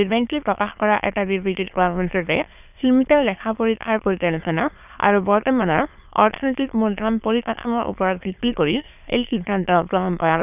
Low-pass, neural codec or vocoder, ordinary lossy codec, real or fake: 3.6 kHz; autoencoder, 22.05 kHz, a latent of 192 numbers a frame, VITS, trained on many speakers; none; fake